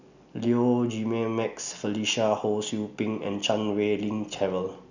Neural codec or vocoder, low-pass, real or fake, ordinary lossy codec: none; 7.2 kHz; real; none